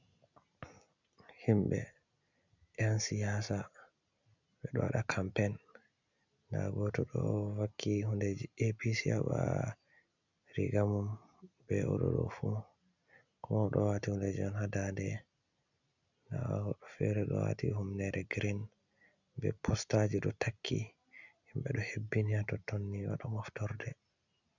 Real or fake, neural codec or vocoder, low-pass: real; none; 7.2 kHz